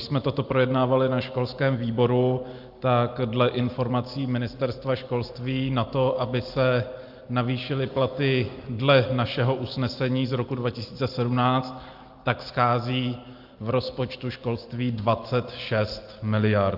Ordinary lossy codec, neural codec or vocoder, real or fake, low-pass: Opus, 24 kbps; none; real; 5.4 kHz